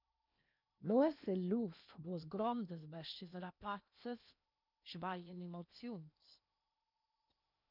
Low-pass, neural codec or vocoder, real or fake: 5.4 kHz; codec, 16 kHz in and 24 kHz out, 0.8 kbps, FocalCodec, streaming, 65536 codes; fake